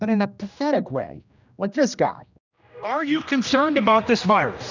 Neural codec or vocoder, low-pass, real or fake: codec, 16 kHz, 1 kbps, X-Codec, HuBERT features, trained on general audio; 7.2 kHz; fake